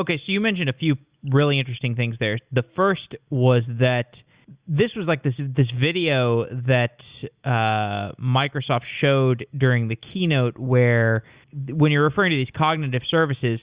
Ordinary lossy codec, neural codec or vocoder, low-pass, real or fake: Opus, 64 kbps; none; 3.6 kHz; real